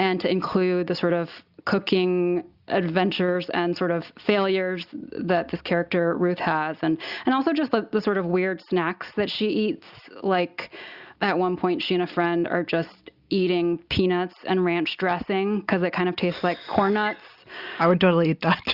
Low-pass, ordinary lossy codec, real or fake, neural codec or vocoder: 5.4 kHz; Opus, 64 kbps; real; none